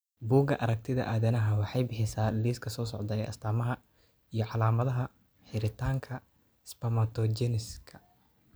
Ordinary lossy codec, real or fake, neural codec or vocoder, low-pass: none; real; none; none